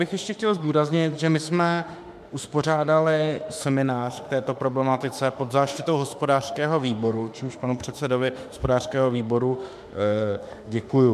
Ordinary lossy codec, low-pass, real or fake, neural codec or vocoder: MP3, 96 kbps; 14.4 kHz; fake; autoencoder, 48 kHz, 32 numbers a frame, DAC-VAE, trained on Japanese speech